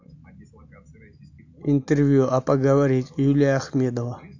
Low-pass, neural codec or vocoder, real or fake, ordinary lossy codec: 7.2 kHz; none; real; AAC, 48 kbps